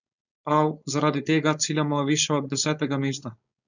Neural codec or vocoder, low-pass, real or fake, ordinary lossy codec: codec, 16 kHz, 4.8 kbps, FACodec; 7.2 kHz; fake; none